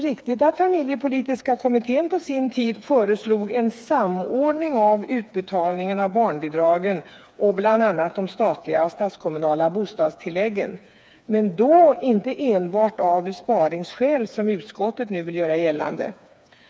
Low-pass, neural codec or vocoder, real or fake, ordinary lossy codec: none; codec, 16 kHz, 4 kbps, FreqCodec, smaller model; fake; none